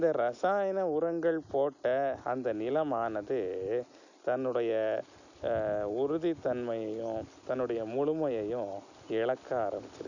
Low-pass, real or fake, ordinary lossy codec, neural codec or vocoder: 7.2 kHz; real; none; none